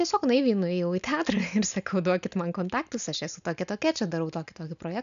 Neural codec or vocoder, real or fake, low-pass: none; real; 7.2 kHz